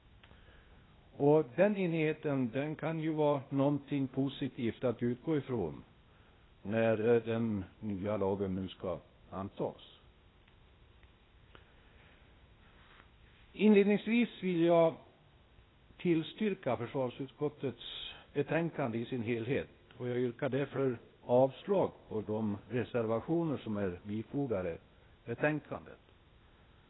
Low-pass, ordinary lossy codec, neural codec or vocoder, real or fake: 7.2 kHz; AAC, 16 kbps; codec, 16 kHz, 0.8 kbps, ZipCodec; fake